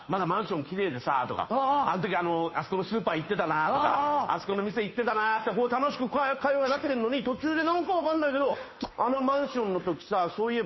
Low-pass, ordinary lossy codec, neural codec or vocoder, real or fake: 7.2 kHz; MP3, 24 kbps; codec, 16 kHz, 2 kbps, FunCodec, trained on Chinese and English, 25 frames a second; fake